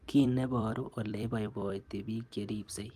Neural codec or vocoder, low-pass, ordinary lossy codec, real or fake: vocoder, 44.1 kHz, 128 mel bands every 256 samples, BigVGAN v2; 14.4 kHz; Opus, 32 kbps; fake